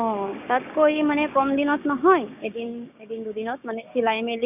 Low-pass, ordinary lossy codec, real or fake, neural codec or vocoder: 3.6 kHz; none; fake; codec, 16 kHz, 6 kbps, DAC